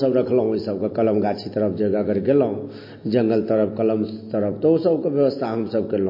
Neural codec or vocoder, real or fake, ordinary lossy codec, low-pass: none; real; MP3, 24 kbps; 5.4 kHz